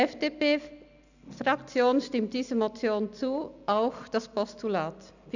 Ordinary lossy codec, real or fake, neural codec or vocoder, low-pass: none; real; none; 7.2 kHz